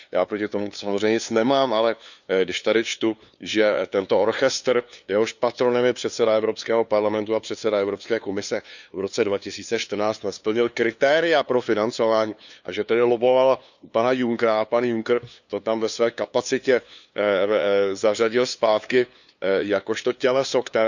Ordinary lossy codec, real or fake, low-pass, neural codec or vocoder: none; fake; 7.2 kHz; codec, 16 kHz, 2 kbps, FunCodec, trained on LibriTTS, 25 frames a second